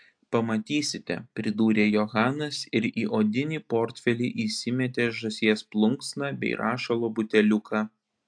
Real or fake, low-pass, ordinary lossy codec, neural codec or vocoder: real; 9.9 kHz; AAC, 64 kbps; none